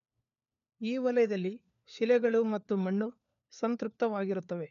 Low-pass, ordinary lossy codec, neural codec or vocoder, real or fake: 7.2 kHz; none; codec, 16 kHz, 4 kbps, FunCodec, trained on LibriTTS, 50 frames a second; fake